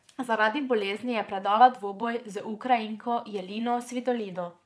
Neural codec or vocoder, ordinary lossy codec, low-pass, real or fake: vocoder, 22.05 kHz, 80 mel bands, Vocos; none; none; fake